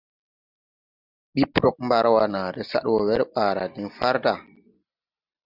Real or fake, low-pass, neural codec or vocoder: real; 5.4 kHz; none